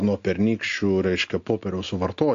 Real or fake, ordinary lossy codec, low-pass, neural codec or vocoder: real; AAC, 48 kbps; 7.2 kHz; none